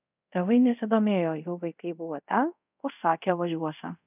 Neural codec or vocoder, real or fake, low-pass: codec, 24 kHz, 0.5 kbps, DualCodec; fake; 3.6 kHz